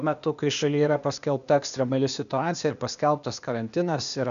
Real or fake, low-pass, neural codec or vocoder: fake; 7.2 kHz; codec, 16 kHz, 0.8 kbps, ZipCodec